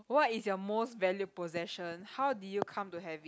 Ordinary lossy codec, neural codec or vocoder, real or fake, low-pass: none; none; real; none